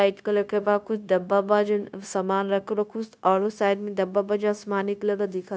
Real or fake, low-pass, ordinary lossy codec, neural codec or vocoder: fake; none; none; codec, 16 kHz, 0.9 kbps, LongCat-Audio-Codec